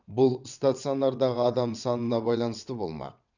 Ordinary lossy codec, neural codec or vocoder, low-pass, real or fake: none; vocoder, 22.05 kHz, 80 mel bands, WaveNeXt; 7.2 kHz; fake